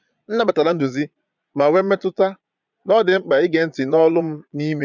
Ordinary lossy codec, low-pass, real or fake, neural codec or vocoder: none; 7.2 kHz; fake; vocoder, 22.05 kHz, 80 mel bands, Vocos